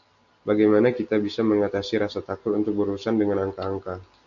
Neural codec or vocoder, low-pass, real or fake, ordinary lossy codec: none; 7.2 kHz; real; AAC, 64 kbps